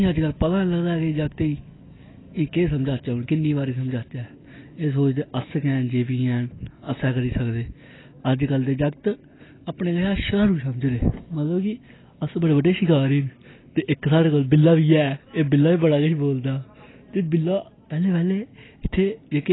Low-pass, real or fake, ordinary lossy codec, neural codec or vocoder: 7.2 kHz; real; AAC, 16 kbps; none